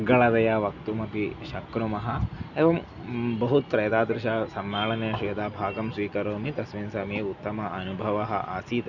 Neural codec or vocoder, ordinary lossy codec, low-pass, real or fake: none; none; 7.2 kHz; real